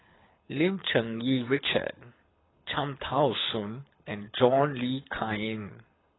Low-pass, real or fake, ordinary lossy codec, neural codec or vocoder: 7.2 kHz; fake; AAC, 16 kbps; codec, 24 kHz, 6 kbps, HILCodec